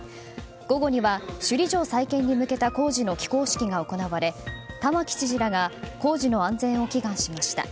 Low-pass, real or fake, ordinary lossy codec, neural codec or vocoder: none; real; none; none